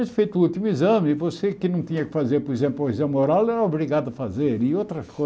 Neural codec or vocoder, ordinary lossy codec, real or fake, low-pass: none; none; real; none